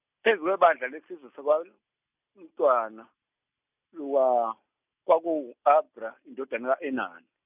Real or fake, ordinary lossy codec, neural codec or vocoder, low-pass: real; none; none; 3.6 kHz